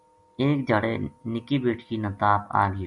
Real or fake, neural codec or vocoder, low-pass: real; none; 10.8 kHz